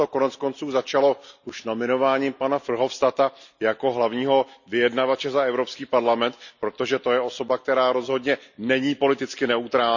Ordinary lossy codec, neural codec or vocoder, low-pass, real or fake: none; none; 7.2 kHz; real